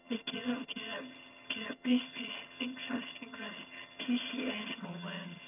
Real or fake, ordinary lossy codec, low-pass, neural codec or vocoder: fake; none; 3.6 kHz; vocoder, 22.05 kHz, 80 mel bands, HiFi-GAN